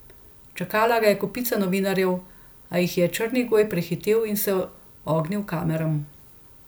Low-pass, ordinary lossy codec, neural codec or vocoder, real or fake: none; none; none; real